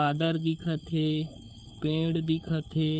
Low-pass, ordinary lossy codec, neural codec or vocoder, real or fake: none; none; codec, 16 kHz, 4 kbps, FunCodec, trained on LibriTTS, 50 frames a second; fake